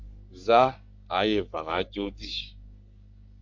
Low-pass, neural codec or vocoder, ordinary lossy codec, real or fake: 7.2 kHz; codec, 44.1 kHz, 3.4 kbps, Pupu-Codec; MP3, 64 kbps; fake